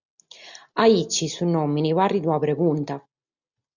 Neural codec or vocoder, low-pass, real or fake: none; 7.2 kHz; real